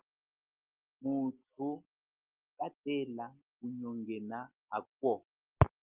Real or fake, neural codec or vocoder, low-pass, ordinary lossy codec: real; none; 3.6 kHz; Opus, 32 kbps